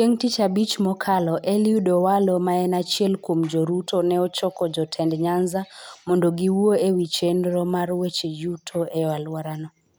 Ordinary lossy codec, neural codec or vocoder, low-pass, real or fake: none; none; none; real